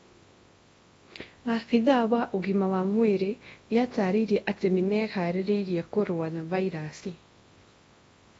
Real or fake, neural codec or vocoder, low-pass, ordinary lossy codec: fake; codec, 24 kHz, 0.9 kbps, WavTokenizer, large speech release; 10.8 kHz; AAC, 24 kbps